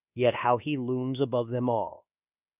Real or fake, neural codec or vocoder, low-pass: fake; codec, 24 kHz, 1.2 kbps, DualCodec; 3.6 kHz